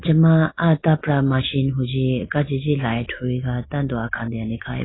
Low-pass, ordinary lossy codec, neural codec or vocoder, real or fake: 7.2 kHz; AAC, 16 kbps; none; real